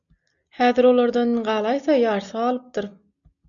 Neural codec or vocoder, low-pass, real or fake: none; 7.2 kHz; real